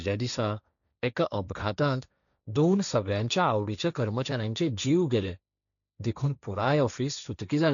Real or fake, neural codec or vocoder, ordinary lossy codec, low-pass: fake; codec, 16 kHz, 1.1 kbps, Voila-Tokenizer; none; 7.2 kHz